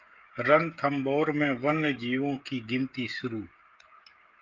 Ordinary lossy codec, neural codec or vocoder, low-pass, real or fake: Opus, 24 kbps; codec, 16 kHz, 16 kbps, FreqCodec, smaller model; 7.2 kHz; fake